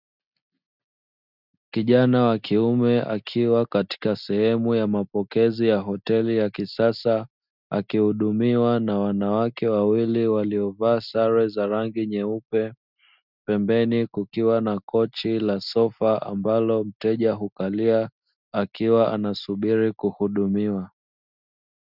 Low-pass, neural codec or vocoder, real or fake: 5.4 kHz; none; real